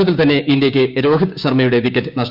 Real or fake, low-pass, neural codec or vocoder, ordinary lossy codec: fake; 5.4 kHz; codec, 16 kHz, 6 kbps, DAC; Opus, 64 kbps